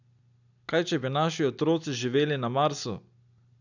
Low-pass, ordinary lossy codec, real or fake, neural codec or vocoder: 7.2 kHz; none; real; none